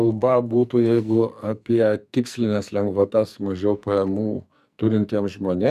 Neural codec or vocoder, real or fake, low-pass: codec, 44.1 kHz, 2.6 kbps, SNAC; fake; 14.4 kHz